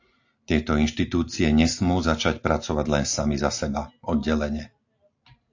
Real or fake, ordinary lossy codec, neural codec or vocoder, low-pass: real; AAC, 48 kbps; none; 7.2 kHz